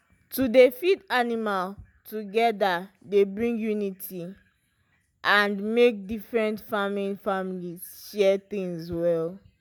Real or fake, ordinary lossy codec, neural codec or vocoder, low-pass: real; none; none; none